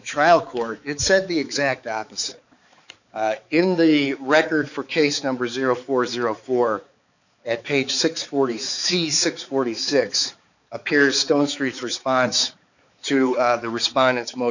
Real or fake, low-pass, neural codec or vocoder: fake; 7.2 kHz; codec, 16 kHz, 4 kbps, X-Codec, HuBERT features, trained on balanced general audio